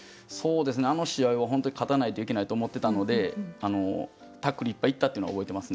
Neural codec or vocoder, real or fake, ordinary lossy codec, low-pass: none; real; none; none